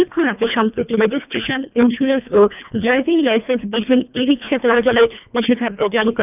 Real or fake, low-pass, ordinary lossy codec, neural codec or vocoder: fake; 3.6 kHz; none; codec, 24 kHz, 1.5 kbps, HILCodec